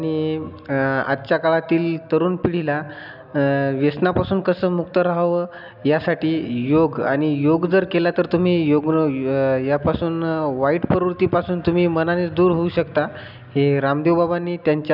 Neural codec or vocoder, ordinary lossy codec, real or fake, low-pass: none; none; real; 5.4 kHz